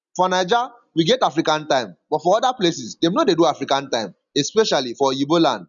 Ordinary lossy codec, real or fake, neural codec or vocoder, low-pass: none; real; none; 7.2 kHz